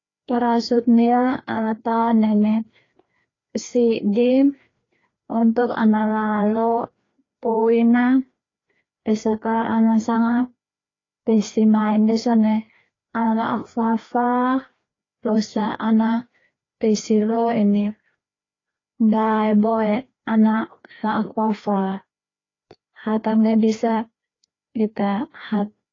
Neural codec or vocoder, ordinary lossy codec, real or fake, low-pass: codec, 16 kHz, 2 kbps, FreqCodec, larger model; AAC, 32 kbps; fake; 7.2 kHz